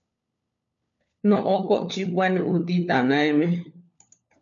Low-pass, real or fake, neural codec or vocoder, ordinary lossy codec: 7.2 kHz; fake; codec, 16 kHz, 4 kbps, FunCodec, trained on LibriTTS, 50 frames a second; MP3, 96 kbps